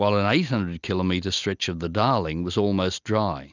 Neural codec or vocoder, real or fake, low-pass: none; real; 7.2 kHz